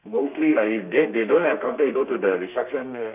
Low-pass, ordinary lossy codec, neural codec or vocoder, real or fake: 3.6 kHz; none; codec, 32 kHz, 1.9 kbps, SNAC; fake